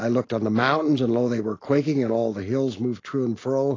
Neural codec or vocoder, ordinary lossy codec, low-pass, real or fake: none; AAC, 32 kbps; 7.2 kHz; real